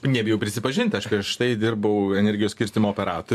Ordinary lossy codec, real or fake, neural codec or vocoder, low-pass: MP3, 96 kbps; fake; vocoder, 44.1 kHz, 128 mel bands every 512 samples, BigVGAN v2; 14.4 kHz